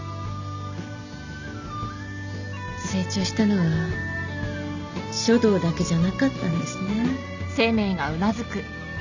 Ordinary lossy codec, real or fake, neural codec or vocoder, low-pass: none; real; none; 7.2 kHz